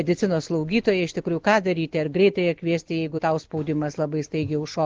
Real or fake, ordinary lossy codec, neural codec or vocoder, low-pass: real; Opus, 16 kbps; none; 7.2 kHz